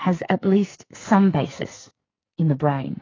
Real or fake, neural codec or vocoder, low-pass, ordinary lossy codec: fake; codec, 44.1 kHz, 2.6 kbps, SNAC; 7.2 kHz; AAC, 32 kbps